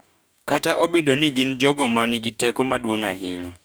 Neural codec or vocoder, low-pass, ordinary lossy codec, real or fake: codec, 44.1 kHz, 2.6 kbps, DAC; none; none; fake